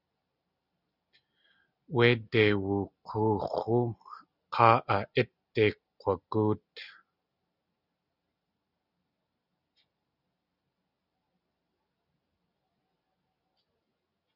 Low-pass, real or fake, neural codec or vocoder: 5.4 kHz; real; none